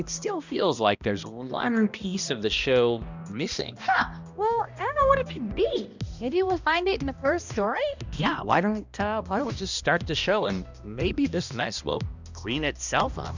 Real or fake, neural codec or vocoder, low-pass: fake; codec, 16 kHz, 1 kbps, X-Codec, HuBERT features, trained on balanced general audio; 7.2 kHz